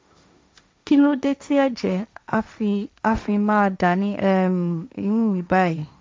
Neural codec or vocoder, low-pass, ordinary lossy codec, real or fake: codec, 16 kHz, 1.1 kbps, Voila-Tokenizer; none; none; fake